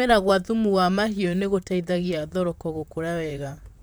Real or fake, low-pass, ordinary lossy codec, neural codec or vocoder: fake; none; none; vocoder, 44.1 kHz, 128 mel bands, Pupu-Vocoder